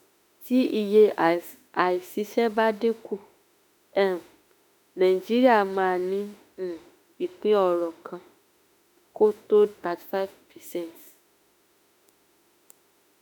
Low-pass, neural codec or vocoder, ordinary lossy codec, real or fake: none; autoencoder, 48 kHz, 32 numbers a frame, DAC-VAE, trained on Japanese speech; none; fake